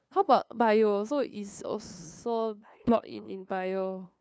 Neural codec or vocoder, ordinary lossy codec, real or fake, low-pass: codec, 16 kHz, 2 kbps, FunCodec, trained on LibriTTS, 25 frames a second; none; fake; none